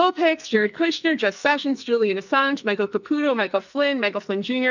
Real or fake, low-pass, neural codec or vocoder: fake; 7.2 kHz; codec, 44.1 kHz, 2.6 kbps, SNAC